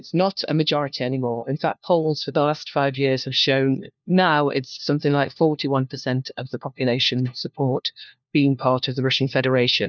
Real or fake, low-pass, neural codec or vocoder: fake; 7.2 kHz; codec, 16 kHz, 1 kbps, FunCodec, trained on LibriTTS, 50 frames a second